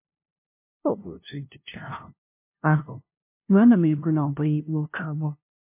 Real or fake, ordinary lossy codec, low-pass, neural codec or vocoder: fake; MP3, 24 kbps; 3.6 kHz; codec, 16 kHz, 0.5 kbps, FunCodec, trained on LibriTTS, 25 frames a second